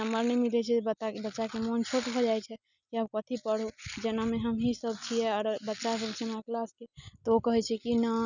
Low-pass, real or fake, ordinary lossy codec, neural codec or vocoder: 7.2 kHz; real; none; none